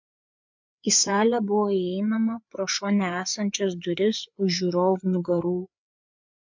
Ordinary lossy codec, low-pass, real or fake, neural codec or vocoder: MP3, 64 kbps; 7.2 kHz; fake; codec, 16 kHz, 4 kbps, FreqCodec, larger model